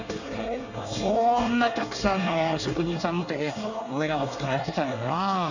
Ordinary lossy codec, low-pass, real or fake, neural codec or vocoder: none; 7.2 kHz; fake; codec, 24 kHz, 1 kbps, SNAC